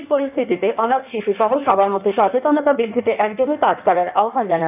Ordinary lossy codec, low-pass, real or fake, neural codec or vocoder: none; 3.6 kHz; fake; codec, 16 kHz in and 24 kHz out, 1.1 kbps, FireRedTTS-2 codec